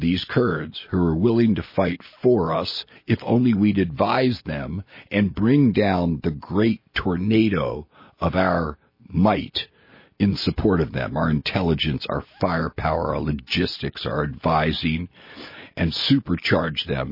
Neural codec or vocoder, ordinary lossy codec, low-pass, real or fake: none; MP3, 24 kbps; 5.4 kHz; real